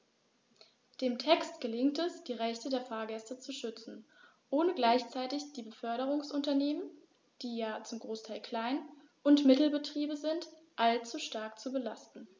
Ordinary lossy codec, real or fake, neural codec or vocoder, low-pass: none; real; none; none